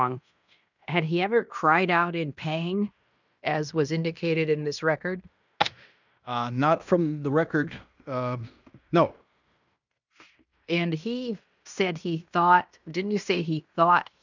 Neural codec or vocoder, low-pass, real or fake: codec, 16 kHz in and 24 kHz out, 0.9 kbps, LongCat-Audio-Codec, fine tuned four codebook decoder; 7.2 kHz; fake